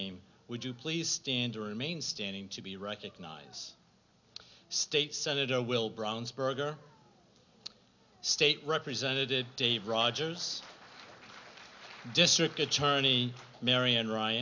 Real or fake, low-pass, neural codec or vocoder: real; 7.2 kHz; none